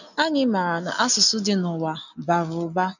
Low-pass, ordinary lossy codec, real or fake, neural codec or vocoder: 7.2 kHz; none; real; none